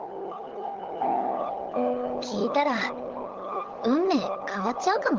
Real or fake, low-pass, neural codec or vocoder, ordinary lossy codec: fake; 7.2 kHz; codec, 16 kHz, 16 kbps, FunCodec, trained on LibriTTS, 50 frames a second; Opus, 16 kbps